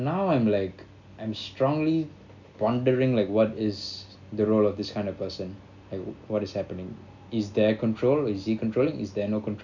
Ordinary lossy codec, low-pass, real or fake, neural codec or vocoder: none; 7.2 kHz; real; none